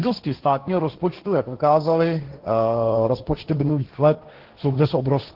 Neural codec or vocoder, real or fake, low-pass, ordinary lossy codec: codec, 16 kHz, 1.1 kbps, Voila-Tokenizer; fake; 5.4 kHz; Opus, 16 kbps